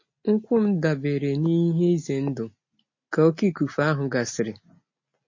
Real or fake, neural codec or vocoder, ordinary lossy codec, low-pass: real; none; MP3, 32 kbps; 7.2 kHz